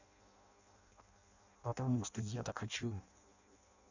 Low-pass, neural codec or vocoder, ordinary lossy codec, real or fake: 7.2 kHz; codec, 16 kHz in and 24 kHz out, 0.6 kbps, FireRedTTS-2 codec; none; fake